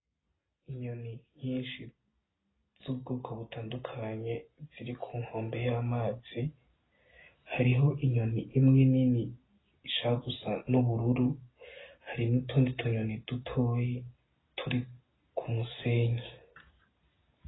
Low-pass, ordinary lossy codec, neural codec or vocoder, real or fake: 7.2 kHz; AAC, 16 kbps; none; real